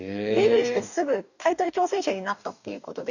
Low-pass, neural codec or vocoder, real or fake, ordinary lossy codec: 7.2 kHz; codec, 44.1 kHz, 2.6 kbps, DAC; fake; none